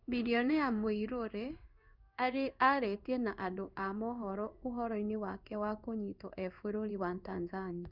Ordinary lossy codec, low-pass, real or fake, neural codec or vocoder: none; 5.4 kHz; fake; codec, 16 kHz in and 24 kHz out, 1 kbps, XY-Tokenizer